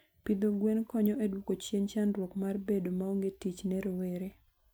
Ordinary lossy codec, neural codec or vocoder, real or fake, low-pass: none; none; real; none